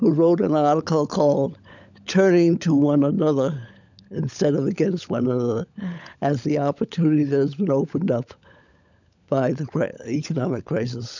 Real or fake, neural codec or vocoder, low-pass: fake; codec, 16 kHz, 16 kbps, FunCodec, trained on LibriTTS, 50 frames a second; 7.2 kHz